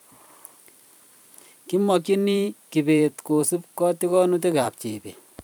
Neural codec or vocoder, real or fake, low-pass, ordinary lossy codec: vocoder, 44.1 kHz, 128 mel bands every 512 samples, BigVGAN v2; fake; none; none